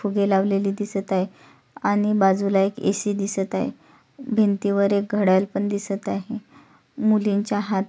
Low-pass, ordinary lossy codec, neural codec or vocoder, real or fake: none; none; none; real